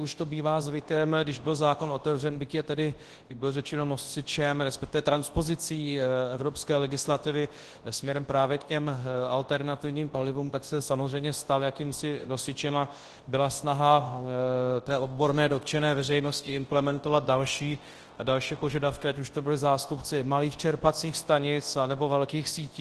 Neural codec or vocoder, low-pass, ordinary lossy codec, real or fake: codec, 24 kHz, 0.9 kbps, WavTokenizer, large speech release; 10.8 kHz; Opus, 16 kbps; fake